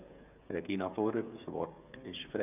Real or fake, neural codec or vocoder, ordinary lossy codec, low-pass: fake; codec, 16 kHz, 8 kbps, FreqCodec, smaller model; none; 3.6 kHz